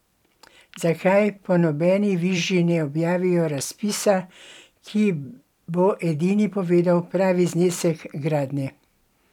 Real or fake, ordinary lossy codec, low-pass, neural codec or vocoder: fake; none; 19.8 kHz; vocoder, 44.1 kHz, 128 mel bands every 256 samples, BigVGAN v2